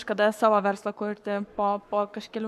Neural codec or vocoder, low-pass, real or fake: codec, 44.1 kHz, 7.8 kbps, Pupu-Codec; 14.4 kHz; fake